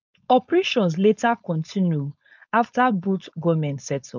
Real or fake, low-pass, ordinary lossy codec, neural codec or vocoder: fake; 7.2 kHz; none; codec, 16 kHz, 4.8 kbps, FACodec